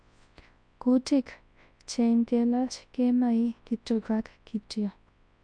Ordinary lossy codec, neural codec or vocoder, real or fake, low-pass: MP3, 64 kbps; codec, 24 kHz, 0.9 kbps, WavTokenizer, large speech release; fake; 9.9 kHz